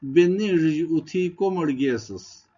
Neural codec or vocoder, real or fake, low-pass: none; real; 7.2 kHz